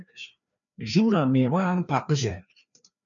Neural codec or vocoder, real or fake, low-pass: codec, 16 kHz, 2 kbps, FreqCodec, larger model; fake; 7.2 kHz